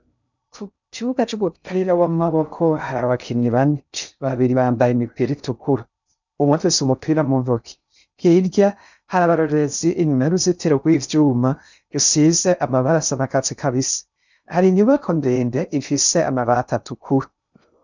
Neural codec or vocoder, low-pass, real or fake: codec, 16 kHz in and 24 kHz out, 0.6 kbps, FocalCodec, streaming, 2048 codes; 7.2 kHz; fake